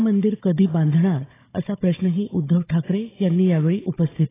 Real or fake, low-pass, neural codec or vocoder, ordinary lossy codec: fake; 3.6 kHz; codec, 16 kHz, 16 kbps, FunCodec, trained on Chinese and English, 50 frames a second; AAC, 16 kbps